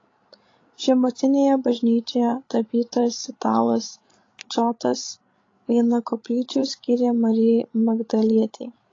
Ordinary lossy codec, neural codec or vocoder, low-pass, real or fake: AAC, 32 kbps; codec, 16 kHz, 16 kbps, FreqCodec, larger model; 7.2 kHz; fake